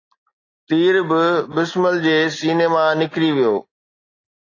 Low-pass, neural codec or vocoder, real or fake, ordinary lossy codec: 7.2 kHz; none; real; AAC, 32 kbps